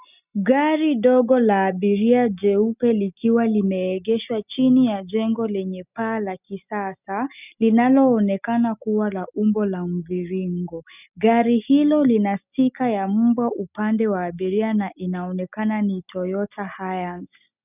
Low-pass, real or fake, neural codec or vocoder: 3.6 kHz; real; none